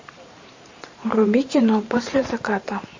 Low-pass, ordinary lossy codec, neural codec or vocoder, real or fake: 7.2 kHz; MP3, 32 kbps; vocoder, 44.1 kHz, 128 mel bands every 512 samples, BigVGAN v2; fake